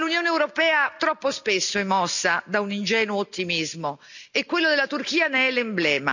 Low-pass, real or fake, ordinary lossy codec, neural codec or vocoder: 7.2 kHz; real; none; none